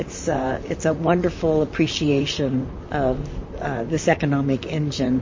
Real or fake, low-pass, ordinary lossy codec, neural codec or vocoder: fake; 7.2 kHz; MP3, 32 kbps; vocoder, 44.1 kHz, 128 mel bands, Pupu-Vocoder